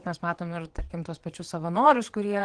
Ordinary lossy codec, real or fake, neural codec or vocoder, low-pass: Opus, 16 kbps; fake; vocoder, 22.05 kHz, 80 mel bands, WaveNeXt; 9.9 kHz